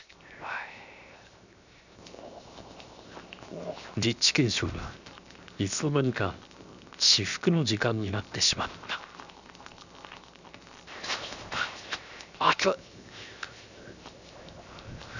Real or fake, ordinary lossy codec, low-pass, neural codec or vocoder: fake; none; 7.2 kHz; codec, 16 kHz, 0.7 kbps, FocalCodec